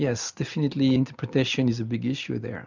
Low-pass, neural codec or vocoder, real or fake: 7.2 kHz; none; real